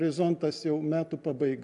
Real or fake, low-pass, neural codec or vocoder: real; 10.8 kHz; none